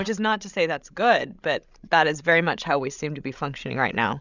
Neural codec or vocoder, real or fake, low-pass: codec, 16 kHz, 16 kbps, FreqCodec, larger model; fake; 7.2 kHz